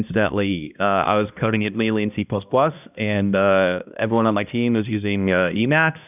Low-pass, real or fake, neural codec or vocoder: 3.6 kHz; fake; codec, 16 kHz, 1 kbps, X-Codec, HuBERT features, trained on balanced general audio